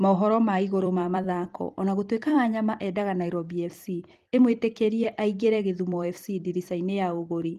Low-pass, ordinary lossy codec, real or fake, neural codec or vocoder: 14.4 kHz; Opus, 24 kbps; fake; vocoder, 44.1 kHz, 128 mel bands every 256 samples, BigVGAN v2